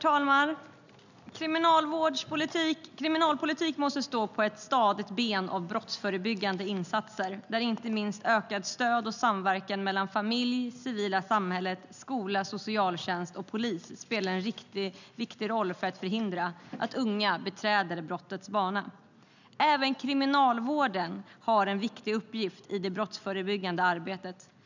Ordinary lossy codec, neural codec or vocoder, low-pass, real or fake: none; none; 7.2 kHz; real